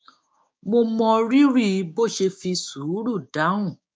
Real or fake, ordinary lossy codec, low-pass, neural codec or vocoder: fake; none; none; codec, 16 kHz, 6 kbps, DAC